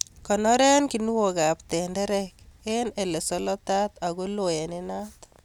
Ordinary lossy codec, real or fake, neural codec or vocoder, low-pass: none; real; none; 19.8 kHz